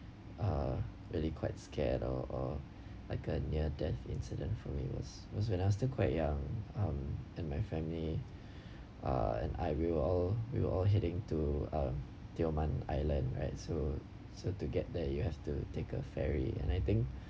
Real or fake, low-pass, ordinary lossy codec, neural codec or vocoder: real; none; none; none